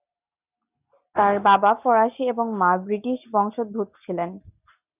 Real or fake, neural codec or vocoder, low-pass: real; none; 3.6 kHz